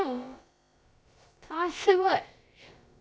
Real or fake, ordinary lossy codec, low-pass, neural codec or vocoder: fake; none; none; codec, 16 kHz, about 1 kbps, DyCAST, with the encoder's durations